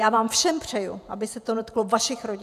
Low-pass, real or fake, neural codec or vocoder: 14.4 kHz; fake; vocoder, 48 kHz, 128 mel bands, Vocos